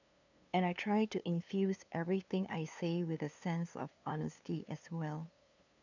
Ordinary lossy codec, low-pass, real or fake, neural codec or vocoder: none; 7.2 kHz; fake; codec, 16 kHz, 2 kbps, FunCodec, trained on LibriTTS, 25 frames a second